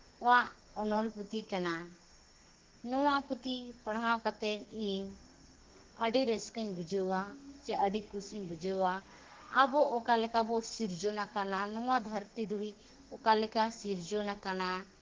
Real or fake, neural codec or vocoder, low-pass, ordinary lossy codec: fake; codec, 32 kHz, 1.9 kbps, SNAC; 7.2 kHz; Opus, 16 kbps